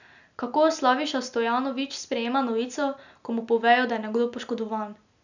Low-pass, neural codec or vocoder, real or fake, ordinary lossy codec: 7.2 kHz; none; real; none